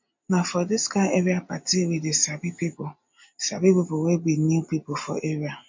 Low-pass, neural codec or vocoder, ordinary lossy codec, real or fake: 7.2 kHz; none; MP3, 48 kbps; real